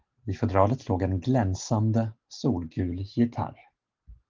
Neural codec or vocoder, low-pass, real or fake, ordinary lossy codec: none; 7.2 kHz; real; Opus, 16 kbps